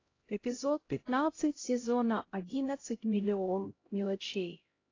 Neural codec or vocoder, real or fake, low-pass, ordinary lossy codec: codec, 16 kHz, 0.5 kbps, X-Codec, HuBERT features, trained on LibriSpeech; fake; 7.2 kHz; AAC, 32 kbps